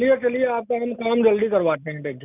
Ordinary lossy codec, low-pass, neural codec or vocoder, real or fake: none; 3.6 kHz; none; real